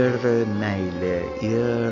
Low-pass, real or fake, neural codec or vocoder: 7.2 kHz; real; none